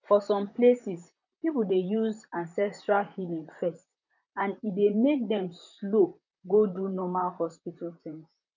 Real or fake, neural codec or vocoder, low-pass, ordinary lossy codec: fake; vocoder, 44.1 kHz, 128 mel bands, Pupu-Vocoder; 7.2 kHz; none